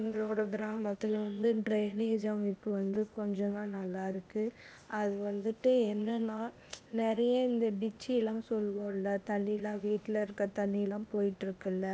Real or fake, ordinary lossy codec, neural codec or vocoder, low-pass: fake; none; codec, 16 kHz, 0.8 kbps, ZipCodec; none